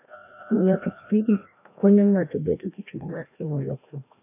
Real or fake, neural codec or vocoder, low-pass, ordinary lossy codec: fake; codec, 16 kHz, 1 kbps, FreqCodec, larger model; 3.6 kHz; AAC, 24 kbps